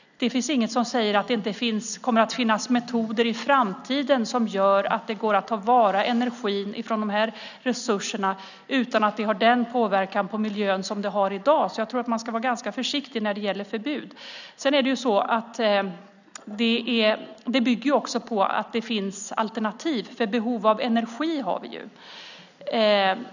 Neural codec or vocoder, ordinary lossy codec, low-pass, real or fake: none; none; 7.2 kHz; real